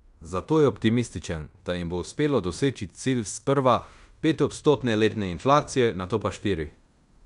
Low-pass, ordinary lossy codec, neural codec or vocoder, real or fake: 10.8 kHz; none; codec, 16 kHz in and 24 kHz out, 0.9 kbps, LongCat-Audio-Codec, fine tuned four codebook decoder; fake